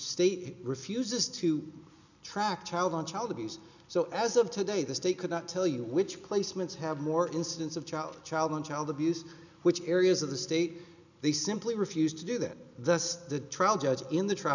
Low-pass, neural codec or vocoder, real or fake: 7.2 kHz; none; real